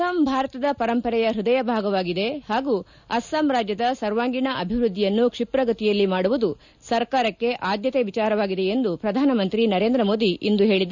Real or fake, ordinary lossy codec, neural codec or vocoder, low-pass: real; none; none; 7.2 kHz